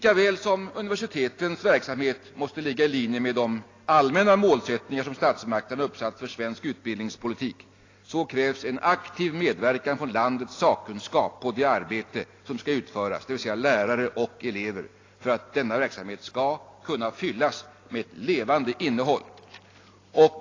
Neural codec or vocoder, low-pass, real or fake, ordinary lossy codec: none; 7.2 kHz; real; AAC, 32 kbps